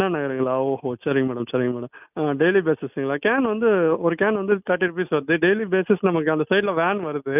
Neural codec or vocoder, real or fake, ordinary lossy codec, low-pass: none; real; none; 3.6 kHz